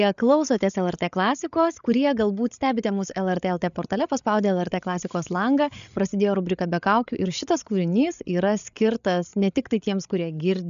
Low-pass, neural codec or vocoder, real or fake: 7.2 kHz; codec, 16 kHz, 8 kbps, FreqCodec, larger model; fake